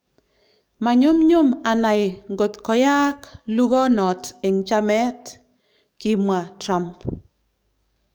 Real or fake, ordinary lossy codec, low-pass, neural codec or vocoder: fake; none; none; codec, 44.1 kHz, 7.8 kbps, DAC